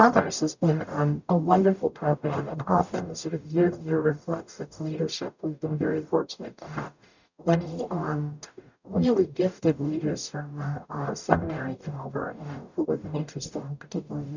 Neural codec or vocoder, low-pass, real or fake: codec, 44.1 kHz, 0.9 kbps, DAC; 7.2 kHz; fake